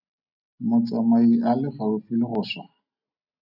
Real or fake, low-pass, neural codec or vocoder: real; 5.4 kHz; none